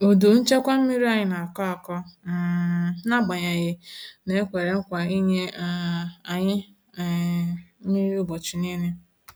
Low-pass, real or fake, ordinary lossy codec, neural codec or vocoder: none; real; none; none